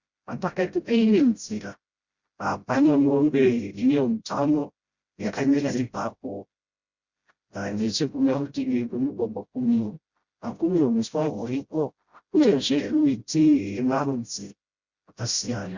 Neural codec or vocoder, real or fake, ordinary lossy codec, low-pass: codec, 16 kHz, 0.5 kbps, FreqCodec, smaller model; fake; Opus, 64 kbps; 7.2 kHz